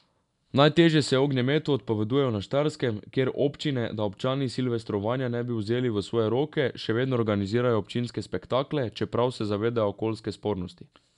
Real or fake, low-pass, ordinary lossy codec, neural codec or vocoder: real; 10.8 kHz; none; none